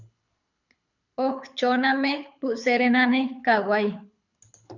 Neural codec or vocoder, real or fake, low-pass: codec, 24 kHz, 6 kbps, HILCodec; fake; 7.2 kHz